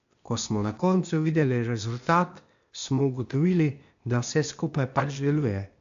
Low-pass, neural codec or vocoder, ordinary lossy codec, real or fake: 7.2 kHz; codec, 16 kHz, 0.8 kbps, ZipCodec; AAC, 64 kbps; fake